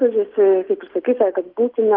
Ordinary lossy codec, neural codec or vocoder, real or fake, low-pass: Opus, 32 kbps; none; real; 5.4 kHz